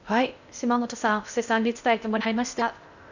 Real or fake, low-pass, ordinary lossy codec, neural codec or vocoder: fake; 7.2 kHz; none; codec, 16 kHz in and 24 kHz out, 0.6 kbps, FocalCodec, streaming, 2048 codes